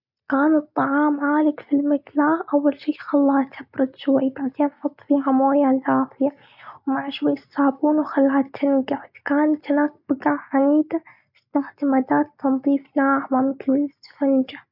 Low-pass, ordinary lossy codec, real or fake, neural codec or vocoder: 5.4 kHz; none; real; none